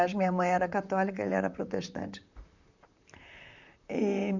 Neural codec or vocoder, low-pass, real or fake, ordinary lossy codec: vocoder, 44.1 kHz, 128 mel bands, Pupu-Vocoder; 7.2 kHz; fake; none